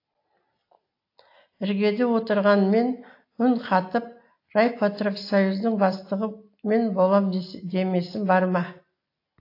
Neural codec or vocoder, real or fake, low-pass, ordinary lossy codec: none; real; 5.4 kHz; AAC, 32 kbps